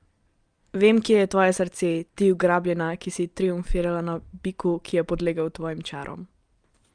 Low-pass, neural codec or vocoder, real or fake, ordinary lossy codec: 9.9 kHz; none; real; Opus, 32 kbps